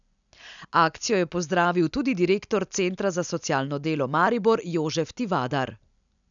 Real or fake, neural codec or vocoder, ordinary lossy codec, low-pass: real; none; none; 7.2 kHz